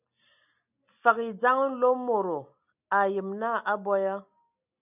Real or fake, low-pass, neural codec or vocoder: real; 3.6 kHz; none